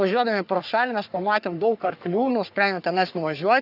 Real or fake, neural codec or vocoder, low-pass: fake; codec, 44.1 kHz, 3.4 kbps, Pupu-Codec; 5.4 kHz